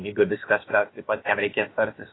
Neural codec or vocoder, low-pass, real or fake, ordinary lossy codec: codec, 16 kHz, about 1 kbps, DyCAST, with the encoder's durations; 7.2 kHz; fake; AAC, 16 kbps